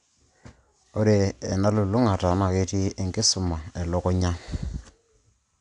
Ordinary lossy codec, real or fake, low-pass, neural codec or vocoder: none; real; 9.9 kHz; none